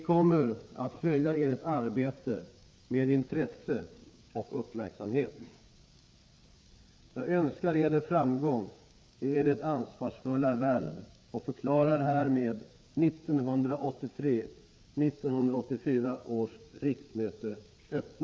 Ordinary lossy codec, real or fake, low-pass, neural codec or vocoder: none; fake; none; codec, 16 kHz, 4 kbps, FreqCodec, larger model